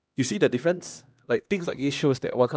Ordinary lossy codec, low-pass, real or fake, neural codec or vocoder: none; none; fake; codec, 16 kHz, 1 kbps, X-Codec, HuBERT features, trained on LibriSpeech